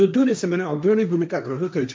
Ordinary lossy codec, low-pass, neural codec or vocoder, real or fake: none; none; codec, 16 kHz, 1.1 kbps, Voila-Tokenizer; fake